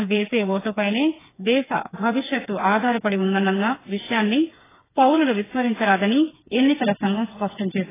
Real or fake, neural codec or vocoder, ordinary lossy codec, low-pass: fake; codec, 16 kHz, 4 kbps, FreqCodec, smaller model; AAC, 16 kbps; 3.6 kHz